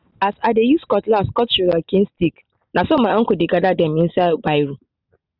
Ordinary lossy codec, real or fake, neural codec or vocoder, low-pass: none; real; none; 5.4 kHz